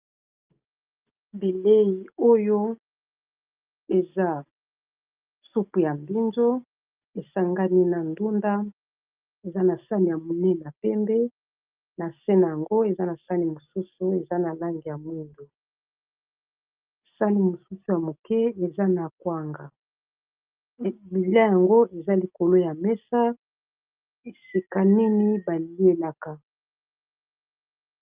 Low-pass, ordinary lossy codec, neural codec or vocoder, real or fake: 3.6 kHz; Opus, 24 kbps; none; real